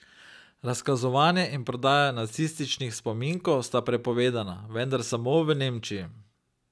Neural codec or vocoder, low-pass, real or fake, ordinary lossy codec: none; none; real; none